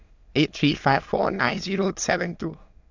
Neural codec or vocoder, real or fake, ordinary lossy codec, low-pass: autoencoder, 22.05 kHz, a latent of 192 numbers a frame, VITS, trained on many speakers; fake; AAC, 48 kbps; 7.2 kHz